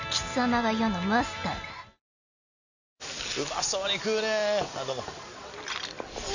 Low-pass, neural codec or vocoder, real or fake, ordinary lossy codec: 7.2 kHz; none; real; none